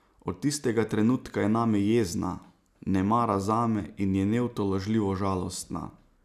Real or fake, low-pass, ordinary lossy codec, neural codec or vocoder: real; 14.4 kHz; none; none